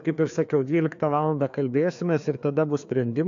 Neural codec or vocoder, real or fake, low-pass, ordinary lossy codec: codec, 16 kHz, 2 kbps, FreqCodec, larger model; fake; 7.2 kHz; AAC, 64 kbps